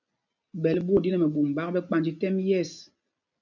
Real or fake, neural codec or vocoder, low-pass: real; none; 7.2 kHz